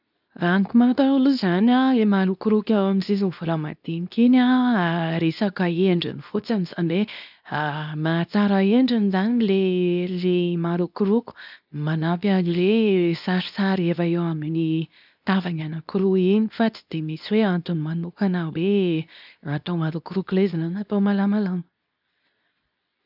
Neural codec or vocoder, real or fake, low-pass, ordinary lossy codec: codec, 24 kHz, 0.9 kbps, WavTokenizer, medium speech release version 2; fake; 5.4 kHz; none